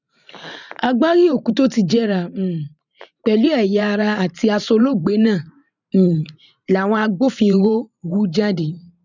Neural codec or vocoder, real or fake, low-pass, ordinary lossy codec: vocoder, 44.1 kHz, 128 mel bands every 512 samples, BigVGAN v2; fake; 7.2 kHz; none